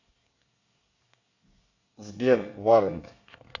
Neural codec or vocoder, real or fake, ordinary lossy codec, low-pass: codec, 24 kHz, 1 kbps, SNAC; fake; AAC, 48 kbps; 7.2 kHz